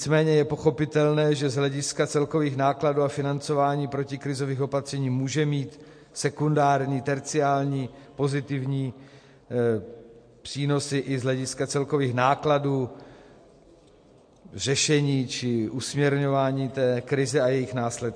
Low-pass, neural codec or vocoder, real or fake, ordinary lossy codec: 9.9 kHz; none; real; MP3, 48 kbps